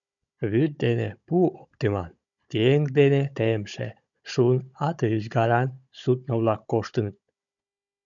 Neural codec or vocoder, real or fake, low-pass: codec, 16 kHz, 16 kbps, FunCodec, trained on Chinese and English, 50 frames a second; fake; 7.2 kHz